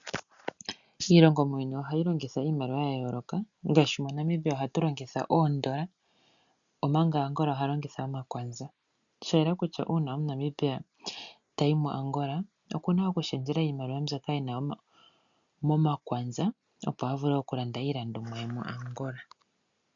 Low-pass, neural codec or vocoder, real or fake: 7.2 kHz; none; real